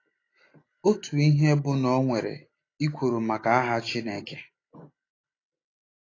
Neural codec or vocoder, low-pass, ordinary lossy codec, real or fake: none; 7.2 kHz; AAC, 32 kbps; real